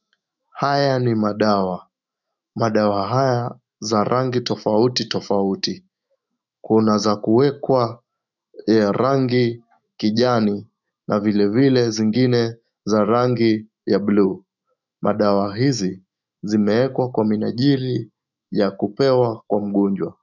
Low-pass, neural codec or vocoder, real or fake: 7.2 kHz; autoencoder, 48 kHz, 128 numbers a frame, DAC-VAE, trained on Japanese speech; fake